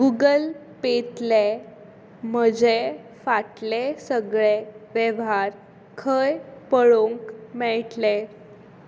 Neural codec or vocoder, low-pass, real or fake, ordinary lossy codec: none; none; real; none